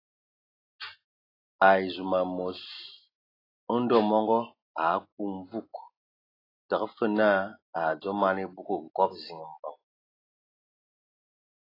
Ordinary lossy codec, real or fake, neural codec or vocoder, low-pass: AAC, 24 kbps; real; none; 5.4 kHz